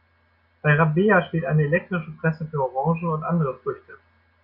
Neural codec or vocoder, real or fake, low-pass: none; real; 5.4 kHz